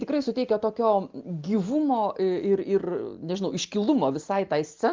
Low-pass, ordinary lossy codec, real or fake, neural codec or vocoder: 7.2 kHz; Opus, 24 kbps; real; none